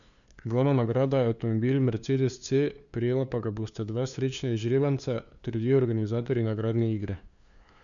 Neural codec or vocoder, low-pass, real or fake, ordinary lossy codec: codec, 16 kHz, 2 kbps, FunCodec, trained on LibriTTS, 25 frames a second; 7.2 kHz; fake; none